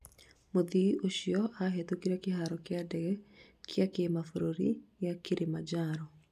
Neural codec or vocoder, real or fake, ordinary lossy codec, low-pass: none; real; none; 14.4 kHz